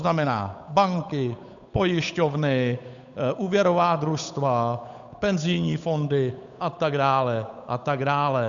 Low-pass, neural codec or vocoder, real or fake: 7.2 kHz; codec, 16 kHz, 8 kbps, FunCodec, trained on Chinese and English, 25 frames a second; fake